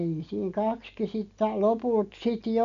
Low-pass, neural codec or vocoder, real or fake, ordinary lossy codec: 7.2 kHz; none; real; none